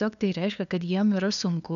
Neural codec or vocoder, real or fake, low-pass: codec, 16 kHz, 2 kbps, FunCodec, trained on LibriTTS, 25 frames a second; fake; 7.2 kHz